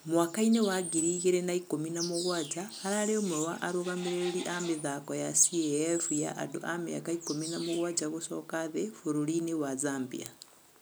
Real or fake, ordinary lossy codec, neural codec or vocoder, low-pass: real; none; none; none